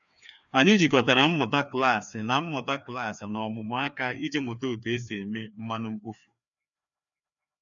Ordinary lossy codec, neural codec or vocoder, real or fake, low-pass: none; codec, 16 kHz, 2 kbps, FreqCodec, larger model; fake; 7.2 kHz